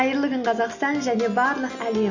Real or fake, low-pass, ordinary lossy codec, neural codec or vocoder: real; 7.2 kHz; none; none